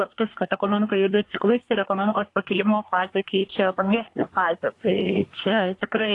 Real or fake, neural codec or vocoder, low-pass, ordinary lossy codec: fake; codec, 24 kHz, 1 kbps, SNAC; 10.8 kHz; AAC, 48 kbps